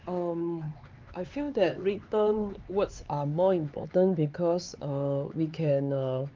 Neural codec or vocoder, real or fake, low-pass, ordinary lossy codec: codec, 16 kHz, 4 kbps, X-Codec, HuBERT features, trained on LibriSpeech; fake; 7.2 kHz; Opus, 24 kbps